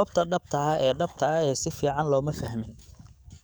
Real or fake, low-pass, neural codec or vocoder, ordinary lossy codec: fake; none; codec, 44.1 kHz, 7.8 kbps, DAC; none